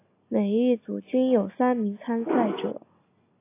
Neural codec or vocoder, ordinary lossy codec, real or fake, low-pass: none; AAC, 24 kbps; real; 3.6 kHz